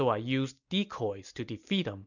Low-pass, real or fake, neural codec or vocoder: 7.2 kHz; real; none